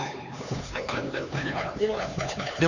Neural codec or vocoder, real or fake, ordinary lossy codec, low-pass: codec, 16 kHz, 4 kbps, X-Codec, HuBERT features, trained on LibriSpeech; fake; none; 7.2 kHz